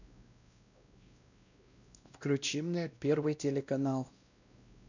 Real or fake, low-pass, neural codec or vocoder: fake; 7.2 kHz; codec, 16 kHz, 1 kbps, X-Codec, WavLM features, trained on Multilingual LibriSpeech